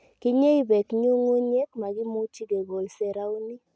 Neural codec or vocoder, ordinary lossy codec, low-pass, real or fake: none; none; none; real